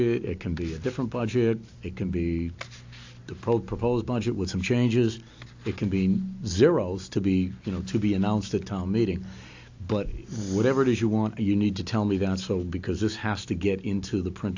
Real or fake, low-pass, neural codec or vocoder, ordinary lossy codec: real; 7.2 kHz; none; AAC, 48 kbps